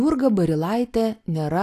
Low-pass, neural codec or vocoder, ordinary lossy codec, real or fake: 14.4 kHz; none; AAC, 64 kbps; real